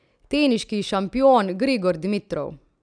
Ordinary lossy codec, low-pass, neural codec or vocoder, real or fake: none; 9.9 kHz; none; real